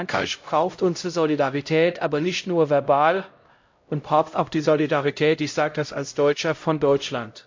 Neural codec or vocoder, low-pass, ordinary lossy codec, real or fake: codec, 16 kHz, 0.5 kbps, X-Codec, HuBERT features, trained on LibriSpeech; 7.2 kHz; MP3, 48 kbps; fake